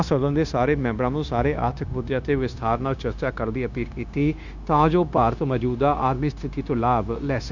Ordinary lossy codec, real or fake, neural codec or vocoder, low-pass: none; fake; codec, 16 kHz, 0.9 kbps, LongCat-Audio-Codec; 7.2 kHz